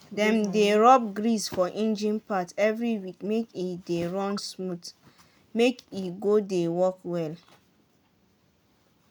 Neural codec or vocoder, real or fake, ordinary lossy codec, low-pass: none; real; none; none